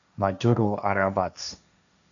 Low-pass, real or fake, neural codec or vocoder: 7.2 kHz; fake; codec, 16 kHz, 1.1 kbps, Voila-Tokenizer